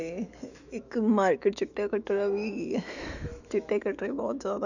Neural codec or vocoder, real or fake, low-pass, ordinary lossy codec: autoencoder, 48 kHz, 128 numbers a frame, DAC-VAE, trained on Japanese speech; fake; 7.2 kHz; none